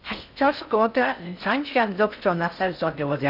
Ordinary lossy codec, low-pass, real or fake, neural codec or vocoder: none; 5.4 kHz; fake; codec, 16 kHz in and 24 kHz out, 0.6 kbps, FocalCodec, streaming, 4096 codes